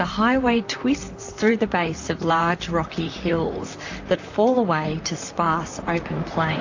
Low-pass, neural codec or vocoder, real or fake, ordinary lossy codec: 7.2 kHz; vocoder, 44.1 kHz, 128 mel bands, Pupu-Vocoder; fake; AAC, 48 kbps